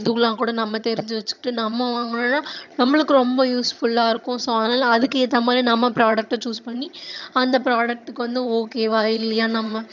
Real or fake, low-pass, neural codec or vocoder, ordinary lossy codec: fake; 7.2 kHz; vocoder, 22.05 kHz, 80 mel bands, HiFi-GAN; none